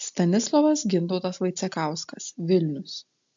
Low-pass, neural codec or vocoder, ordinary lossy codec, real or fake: 7.2 kHz; none; AAC, 64 kbps; real